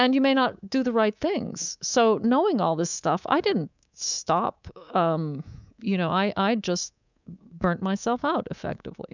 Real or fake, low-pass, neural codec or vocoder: fake; 7.2 kHz; codec, 24 kHz, 3.1 kbps, DualCodec